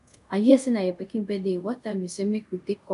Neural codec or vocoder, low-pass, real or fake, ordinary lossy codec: codec, 24 kHz, 0.5 kbps, DualCodec; 10.8 kHz; fake; none